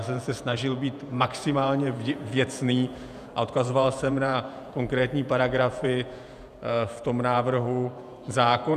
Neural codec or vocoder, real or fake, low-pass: vocoder, 48 kHz, 128 mel bands, Vocos; fake; 14.4 kHz